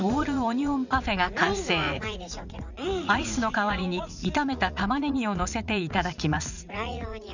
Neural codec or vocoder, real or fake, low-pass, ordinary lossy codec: vocoder, 44.1 kHz, 80 mel bands, Vocos; fake; 7.2 kHz; none